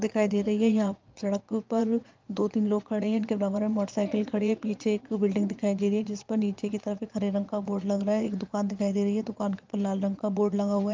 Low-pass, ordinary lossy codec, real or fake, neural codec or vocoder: 7.2 kHz; Opus, 32 kbps; fake; vocoder, 22.05 kHz, 80 mel bands, Vocos